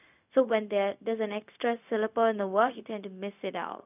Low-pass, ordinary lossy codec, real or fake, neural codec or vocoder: 3.6 kHz; none; fake; codec, 16 kHz, 0.4 kbps, LongCat-Audio-Codec